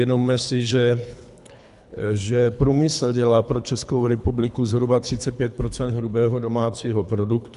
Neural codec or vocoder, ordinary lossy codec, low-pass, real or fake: codec, 24 kHz, 3 kbps, HILCodec; MP3, 96 kbps; 10.8 kHz; fake